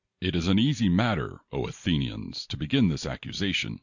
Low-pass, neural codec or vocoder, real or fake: 7.2 kHz; none; real